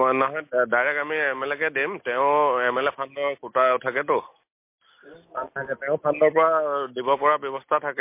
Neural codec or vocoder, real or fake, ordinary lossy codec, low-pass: none; real; MP3, 24 kbps; 3.6 kHz